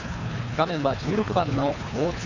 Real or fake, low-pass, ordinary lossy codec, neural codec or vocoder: fake; 7.2 kHz; none; codec, 24 kHz, 3 kbps, HILCodec